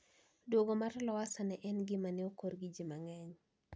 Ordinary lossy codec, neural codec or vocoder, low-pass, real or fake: none; none; none; real